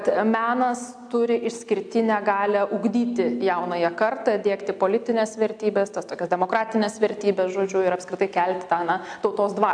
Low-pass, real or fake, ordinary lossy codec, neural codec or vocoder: 9.9 kHz; real; AAC, 64 kbps; none